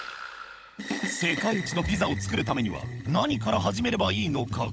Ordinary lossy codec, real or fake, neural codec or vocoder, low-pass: none; fake; codec, 16 kHz, 16 kbps, FunCodec, trained on LibriTTS, 50 frames a second; none